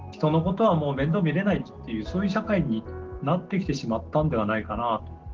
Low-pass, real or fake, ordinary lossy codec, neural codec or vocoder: 7.2 kHz; real; Opus, 16 kbps; none